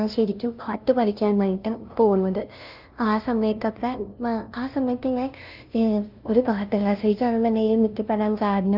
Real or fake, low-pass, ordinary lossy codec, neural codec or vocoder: fake; 5.4 kHz; Opus, 24 kbps; codec, 16 kHz, 0.5 kbps, FunCodec, trained on LibriTTS, 25 frames a second